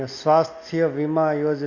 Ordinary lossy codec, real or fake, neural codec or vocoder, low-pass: none; real; none; 7.2 kHz